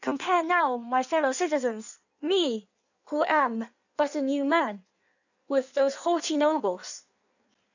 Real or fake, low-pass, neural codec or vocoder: fake; 7.2 kHz; codec, 16 kHz in and 24 kHz out, 1.1 kbps, FireRedTTS-2 codec